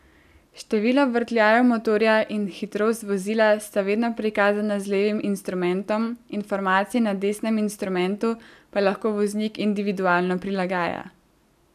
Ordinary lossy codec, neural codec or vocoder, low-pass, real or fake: none; none; 14.4 kHz; real